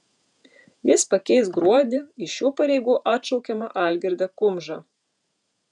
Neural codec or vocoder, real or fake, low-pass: vocoder, 48 kHz, 128 mel bands, Vocos; fake; 10.8 kHz